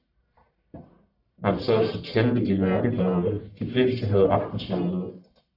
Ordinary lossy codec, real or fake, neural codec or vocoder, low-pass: AAC, 48 kbps; fake; codec, 44.1 kHz, 1.7 kbps, Pupu-Codec; 5.4 kHz